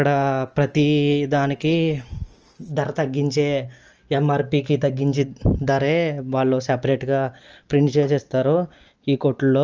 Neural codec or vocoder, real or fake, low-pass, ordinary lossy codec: none; real; 7.2 kHz; Opus, 24 kbps